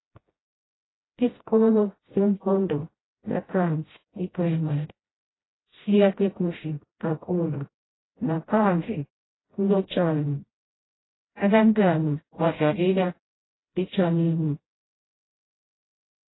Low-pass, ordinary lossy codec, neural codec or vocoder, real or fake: 7.2 kHz; AAC, 16 kbps; codec, 16 kHz, 0.5 kbps, FreqCodec, smaller model; fake